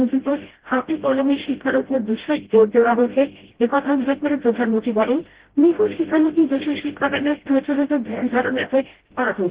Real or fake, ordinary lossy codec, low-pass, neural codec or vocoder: fake; Opus, 16 kbps; 3.6 kHz; codec, 16 kHz, 0.5 kbps, FreqCodec, smaller model